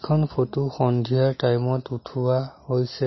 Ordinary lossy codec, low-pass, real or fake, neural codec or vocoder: MP3, 24 kbps; 7.2 kHz; real; none